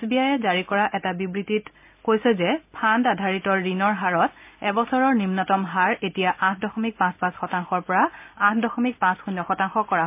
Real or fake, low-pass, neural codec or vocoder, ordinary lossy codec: real; 3.6 kHz; none; MP3, 32 kbps